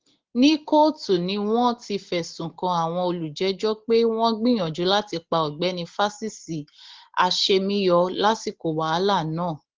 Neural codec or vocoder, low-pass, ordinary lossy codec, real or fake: none; 7.2 kHz; Opus, 16 kbps; real